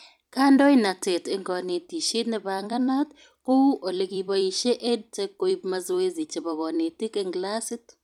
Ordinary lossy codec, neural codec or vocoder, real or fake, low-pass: none; vocoder, 44.1 kHz, 128 mel bands every 256 samples, BigVGAN v2; fake; 19.8 kHz